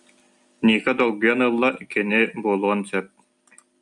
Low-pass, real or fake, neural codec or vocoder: 10.8 kHz; real; none